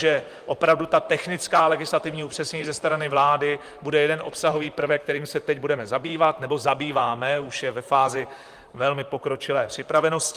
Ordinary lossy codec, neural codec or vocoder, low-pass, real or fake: Opus, 32 kbps; vocoder, 44.1 kHz, 128 mel bands, Pupu-Vocoder; 14.4 kHz; fake